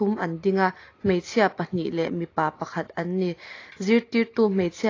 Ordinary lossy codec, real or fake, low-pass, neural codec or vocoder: AAC, 32 kbps; real; 7.2 kHz; none